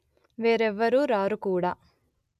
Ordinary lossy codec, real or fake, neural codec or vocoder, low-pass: none; real; none; 14.4 kHz